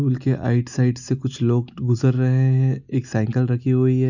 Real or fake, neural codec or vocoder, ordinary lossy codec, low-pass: real; none; none; 7.2 kHz